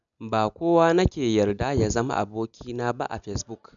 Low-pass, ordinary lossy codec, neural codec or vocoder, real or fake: 7.2 kHz; none; none; real